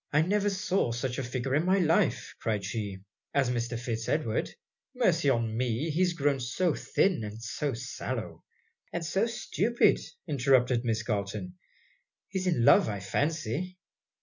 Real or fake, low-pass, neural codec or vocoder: real; 7.2 kHz; none